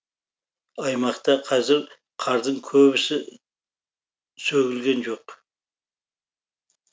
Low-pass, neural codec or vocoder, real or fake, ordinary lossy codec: none; none; real; none